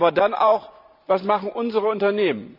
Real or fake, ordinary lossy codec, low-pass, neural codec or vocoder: fake; none; 5.4 kHz; vocoder, 44.1 kHz, 128 mel bands every 512 samples, BigVGAN v2